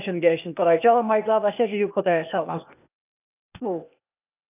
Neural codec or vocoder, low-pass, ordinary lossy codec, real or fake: codec, 16 kHz, 0.8 kbps, ZipCodec; 3.6 kHz; none; fake